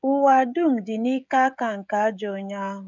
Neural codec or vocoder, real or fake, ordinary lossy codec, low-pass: codec, 16 kHz, 16 kbps, FreqCodec, smaller model; fake; none; 7.2 kHz